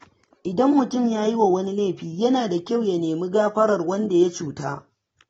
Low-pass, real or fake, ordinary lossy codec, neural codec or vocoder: 19.8 kHz; real; AAC, 24 kbps; none